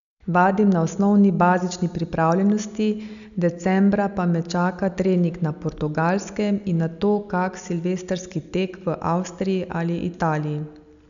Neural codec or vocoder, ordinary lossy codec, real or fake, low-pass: none; none; real; 7.2 kHz